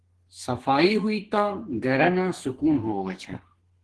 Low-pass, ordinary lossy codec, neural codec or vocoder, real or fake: 10.8 kHz; Opus, 16 kbps; codec, 32 kHz, 1.9 kbps, SNAC; fake